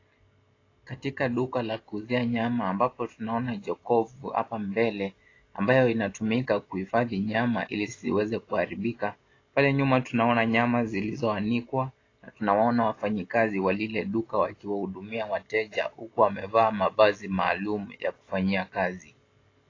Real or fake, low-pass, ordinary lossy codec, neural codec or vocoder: real; 7.2 kHz; AAC, 32 kbps; none